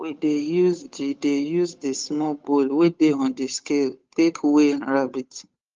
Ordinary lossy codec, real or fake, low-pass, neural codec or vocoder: Opus, 32 kbps; fake; 7.2 kHz; codec, 16 kHz, 8 kbps, FunCodec, trained on Chinese and English, 25 frames a second